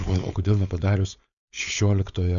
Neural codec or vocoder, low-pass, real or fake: codec, 16 kHz, 4.8 kbps, FACodec; 7.2 kHz; fake